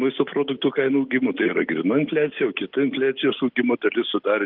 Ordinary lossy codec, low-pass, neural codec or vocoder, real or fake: Opus, 32 kbps; 5.4 kHz; none; real